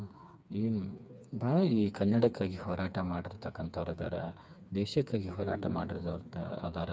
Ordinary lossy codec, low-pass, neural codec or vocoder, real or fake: none; none; codec, 16 kHz, 4 kbps, FreqCodec, smaller model; fake